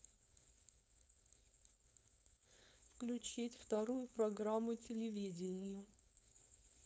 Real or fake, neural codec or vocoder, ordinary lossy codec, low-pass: fake; codec, 16 kHz, 4.8 kbps, FACodec; none; none